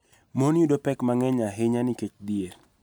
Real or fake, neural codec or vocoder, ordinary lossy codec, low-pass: real; none; none; none